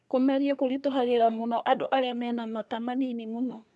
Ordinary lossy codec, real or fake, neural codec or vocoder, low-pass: none; fake; codec, 24 kHz, 1 kbps, SNAC; none